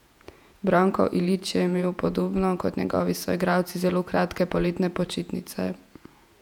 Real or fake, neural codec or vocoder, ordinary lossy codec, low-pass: fake; vocoder, 48 kHz, 128 mel bands, Vocos; none; 19.8 kHz